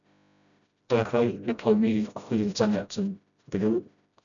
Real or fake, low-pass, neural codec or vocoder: fake; 7.2 kHz; codec, 16 kHz, 0.5 kbps, FreqCodec, smaller model